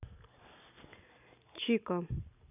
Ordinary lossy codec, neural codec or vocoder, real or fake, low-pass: none; none; real; 3.6 kHz